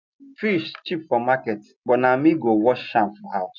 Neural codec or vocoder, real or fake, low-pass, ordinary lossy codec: none; real; 7.2 kHz; none